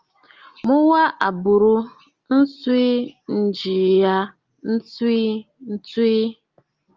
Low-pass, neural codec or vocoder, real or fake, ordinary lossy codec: 7.2 kHz; none; real; Opus, 32 kbps